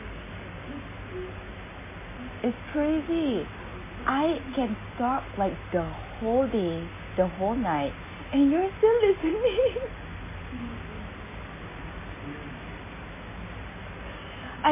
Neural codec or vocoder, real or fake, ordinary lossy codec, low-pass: none; real; MP3, 16 kbps; 3.6 kHz